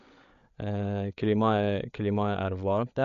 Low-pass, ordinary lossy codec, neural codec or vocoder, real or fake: 7.2 kHz; none; codec, 16 kHz, 8 kbps, FreqCodec, larger model; fake